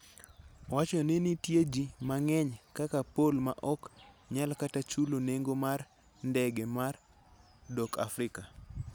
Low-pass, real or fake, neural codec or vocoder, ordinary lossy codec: none; real; none; none